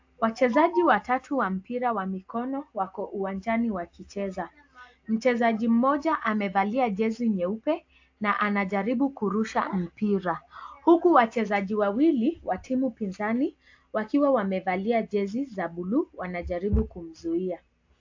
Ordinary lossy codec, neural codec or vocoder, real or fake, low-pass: AAC, 48 kbps; none; real; 7.2 kHz